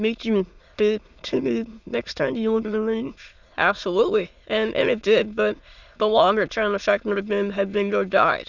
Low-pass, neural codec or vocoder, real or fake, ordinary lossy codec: 7.2 kHz; autoencoder, 22.05 kHz, a latent of 192 numbers a frame, VITS, trained on many speakers; fake; Opus, 64 kbps